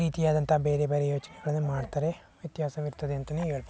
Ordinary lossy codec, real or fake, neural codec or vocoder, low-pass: none; real; none; none